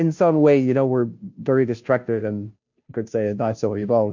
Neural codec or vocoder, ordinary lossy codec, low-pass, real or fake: codec, 16 kHz, 0.5 kbps, FunCodec, trained on Chinese and English, 25 frames a second; MP3, 48 kbps; 7.2 kHz; fake